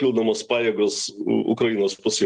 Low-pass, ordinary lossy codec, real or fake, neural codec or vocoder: 9.9 kHz; Opus, 32 kbps; real; none